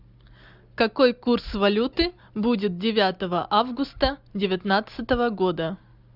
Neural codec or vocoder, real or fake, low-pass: none; real; 5.4 kHz